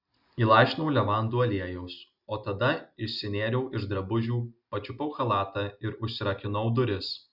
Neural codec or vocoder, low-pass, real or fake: none; 5.4 kHz; real